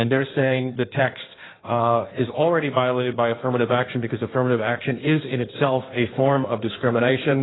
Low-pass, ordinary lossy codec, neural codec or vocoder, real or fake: 7.2 kHz; AAC, 16 kbps; codec, 16 kHz in and 24 kHz out, 1.1 kbps, FireRedTTS-2 codec; fake